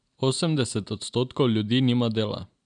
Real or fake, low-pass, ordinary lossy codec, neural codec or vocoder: real; 9.9 kHz; none; none